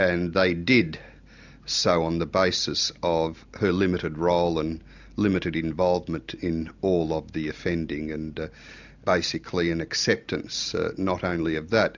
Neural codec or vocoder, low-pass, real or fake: none; 7.2 kHz; real